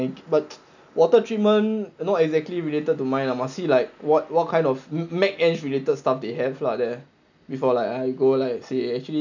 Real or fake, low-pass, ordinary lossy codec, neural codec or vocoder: real; 7.2 kHz; none; none